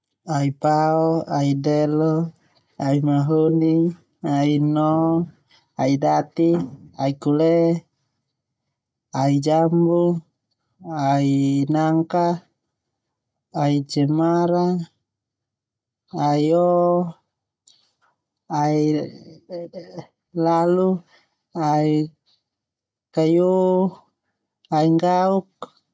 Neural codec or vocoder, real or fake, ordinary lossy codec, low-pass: none; real; none; none